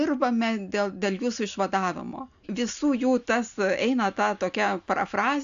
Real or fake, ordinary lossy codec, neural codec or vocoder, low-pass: real; AAC, 96 kbps; none; 7.2 kHz